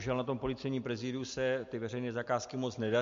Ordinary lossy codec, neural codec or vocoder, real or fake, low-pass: MP3, 48 kbps; none; real; 7.2 kHz